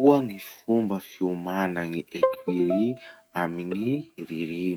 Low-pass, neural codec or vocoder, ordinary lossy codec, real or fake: 19.8 kHz; codec, 44.1 kHz, 7.8 kbps, DAC; none; fake